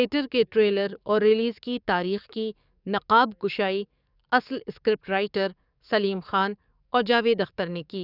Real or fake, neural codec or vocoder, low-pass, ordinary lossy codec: fake; codec, 44.1 kHz, 7.8 kbps, DAC; 5.4 kHz; none